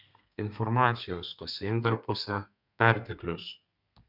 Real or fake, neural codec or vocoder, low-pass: fake; codec, 32 kHz, 1.9 kbps, SNAC; 5.4 kHz